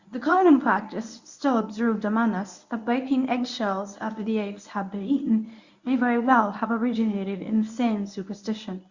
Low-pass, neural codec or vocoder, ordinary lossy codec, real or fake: 7.2 kHz; codec, 24 kHz, 0.9 kbps, WavTokenizer, medium speech release version 1; Opus, 64 kbps; fake